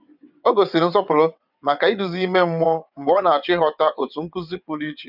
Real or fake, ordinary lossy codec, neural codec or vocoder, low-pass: fake; none; vocoder, 22.05 kHz, 80 mel bands, WaveNeXt; 5.4 kHz